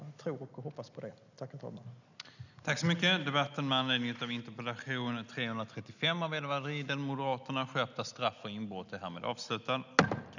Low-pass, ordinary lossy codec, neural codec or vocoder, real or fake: 7.2 kHz; none; none; real